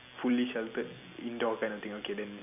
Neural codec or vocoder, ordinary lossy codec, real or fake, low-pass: none; none; real; 3.6 kHz